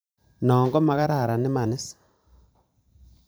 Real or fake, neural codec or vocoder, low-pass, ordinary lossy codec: fake; vocoder, 44.1 kHz, 128 mel bands every 512 samples, BigVGAN v2; none; none